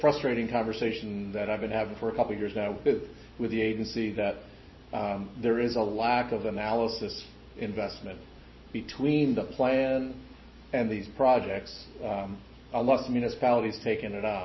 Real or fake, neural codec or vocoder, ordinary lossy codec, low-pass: real; none; MP3, 24 kbps; 7.2 kHz